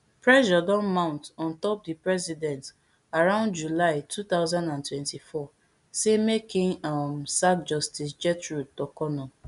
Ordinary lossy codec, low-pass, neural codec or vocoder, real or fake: none; 10.8 kHz; none; real